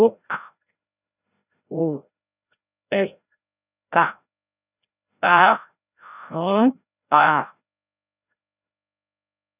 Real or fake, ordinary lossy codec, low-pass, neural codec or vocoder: fake; none; 3.6 kHz; codec, 16 kHz, 0.5 kbps, FreqCodec, larger model